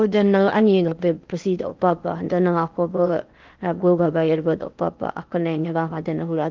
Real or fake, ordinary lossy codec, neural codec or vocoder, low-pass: fake; Opus, 32 kbps; codec, 16 kHz in and 24 kHz out, 0.8 kbps, FocalCodec, streaming, 65536 codes; 7.2 kHz